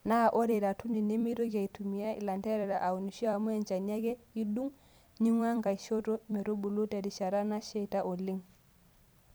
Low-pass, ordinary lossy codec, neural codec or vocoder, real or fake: none; none; vocoder, 44.1 kHz, 128 mel bands every 256 samples, BigVGAN v2; fake